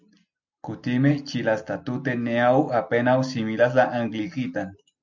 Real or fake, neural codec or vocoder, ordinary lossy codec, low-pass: real; none; MP3, 64 kbps; 7.2 kHz